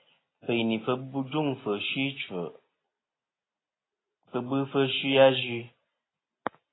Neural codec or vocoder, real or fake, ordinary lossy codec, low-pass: none; real; AAC, 16 kbps; 7.2 kHz